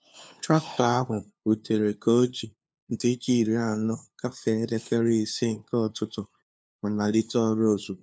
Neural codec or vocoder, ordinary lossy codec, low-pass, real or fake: codec, 16 kHz, 2 kbps, FunCodec, trained on LibriTTS, 25 frames a second; none; none; fake